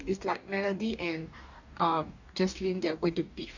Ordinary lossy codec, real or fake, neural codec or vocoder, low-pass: none; fake; codec, 44.1 kHz, 2.6 kbps, DAC; 7.2 kHz